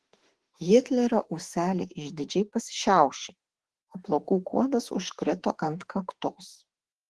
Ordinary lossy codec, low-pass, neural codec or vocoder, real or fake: Opus, 16 kbps; 10.8 kHz; autoencoder, 48 kHz, 32 numbers a frame, DAC-VAE, trained on Japanese speech; fake